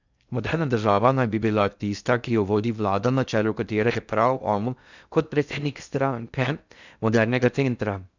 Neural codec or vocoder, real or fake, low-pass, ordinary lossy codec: codec, 16 kHz in and 24 kHz out, 0.6 kbps, FocalCodec, streaming, 2048 codes; fake; 7.2 kHz; none